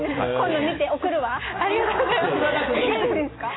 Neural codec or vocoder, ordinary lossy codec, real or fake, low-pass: none; AAC, 16 kbps; real; 7.2 kHz